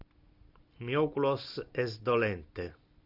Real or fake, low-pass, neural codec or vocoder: real; 5.4 kHz; none